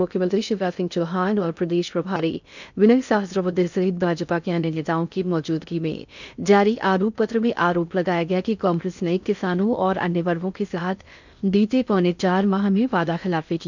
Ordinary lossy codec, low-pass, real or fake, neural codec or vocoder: none; 7.2 kHz; fake; codec, 16 kHz in and 24 kHz out, 0.6 kbps, FocalCodec, streaming, 2048 codes